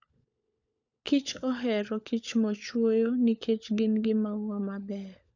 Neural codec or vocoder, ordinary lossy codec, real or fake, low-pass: codec, 16 kHz, 16 kbps, FunCodec, trained on LibriTTS, 50 frames a second; none; fake; 7.2 kHz